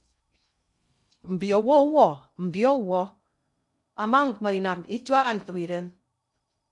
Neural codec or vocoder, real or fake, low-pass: codec, 16 kHz in and 24 kHz out, 0.6 kbps, FocalCodec, streaming, 2048 codes; fake; 10.8 kHz